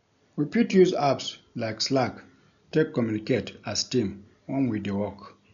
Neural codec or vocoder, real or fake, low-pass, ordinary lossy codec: none; real; 7.2 kHz; none